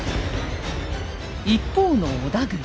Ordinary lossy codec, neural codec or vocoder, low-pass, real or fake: none; none; none; real